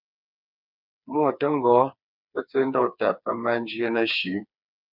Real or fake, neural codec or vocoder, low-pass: fake; codec, 16 kHz, 4 kbps, FreqCodec, smaller model; 5.4 kHz